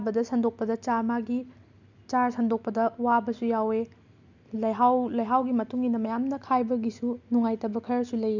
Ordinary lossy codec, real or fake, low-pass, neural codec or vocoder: none; real; 7.2 kHz; none